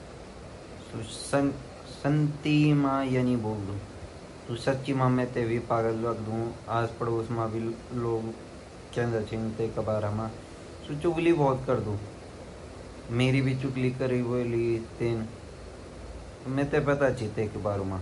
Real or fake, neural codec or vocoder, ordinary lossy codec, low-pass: real; none; MP3, 48 kbps; 14.4 kHz